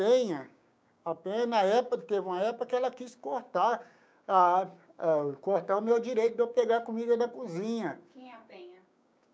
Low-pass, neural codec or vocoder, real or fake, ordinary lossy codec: none; codec, 16 kHz, 6 kbps, DAC; fake; none